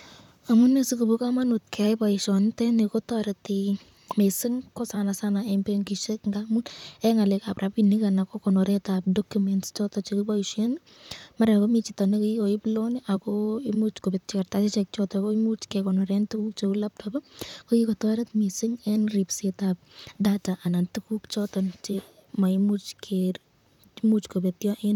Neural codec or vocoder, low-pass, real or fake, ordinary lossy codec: vocoder, 44.1 kHz, 128 mel bands, Pupu-Vocoder; 19.8 kHz; fake; none